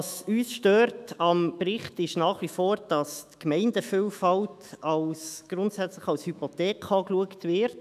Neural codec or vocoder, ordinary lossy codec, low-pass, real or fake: autoencoder, 48 kHz, 128 numbers a frame, DAC-VAE, trained on Japanese speech; none; 14.4 kHz; fake